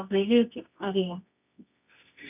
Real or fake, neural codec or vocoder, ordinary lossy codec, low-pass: fake; codec, 24 kHz, 0.9 kbps, WavTokenizer, medium music audio release; none; 3.6 kHz